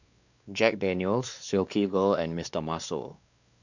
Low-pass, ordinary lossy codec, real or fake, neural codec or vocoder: 7.2 kHz; none; fake; codec, 16 kHz, 2 kbps, X-Codec, WavLM features, trained on Multilingual LibriSpeech